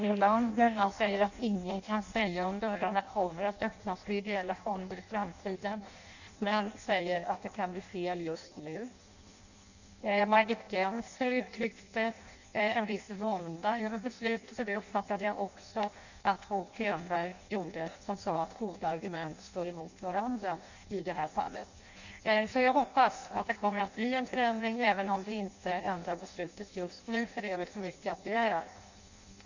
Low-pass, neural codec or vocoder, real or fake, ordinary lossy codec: 7.2 kHz; codec, 16 kHz in and 24 kHz out, 0.6 kbps, FireRedTTS-2 codec; fake; none